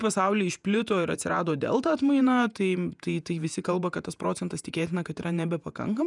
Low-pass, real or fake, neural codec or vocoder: 10.8 kHz; fake; vocoder, 48 kHz, 128 mel bands, Vocos